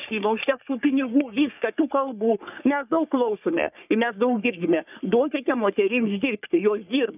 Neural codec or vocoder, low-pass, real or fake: codec, 44.1 kHz, 3.4 kbps, Pupu-Codec; 3.6 kHz; fake